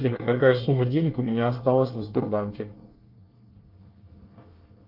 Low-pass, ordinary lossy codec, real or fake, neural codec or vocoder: 5.4 kHz; Opus, 24 kbps; fake; codec, 24 kHz, 1 kbps, SNAC